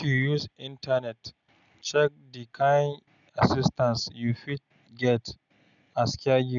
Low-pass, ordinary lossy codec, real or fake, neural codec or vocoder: 7.2 kHz; none; real; none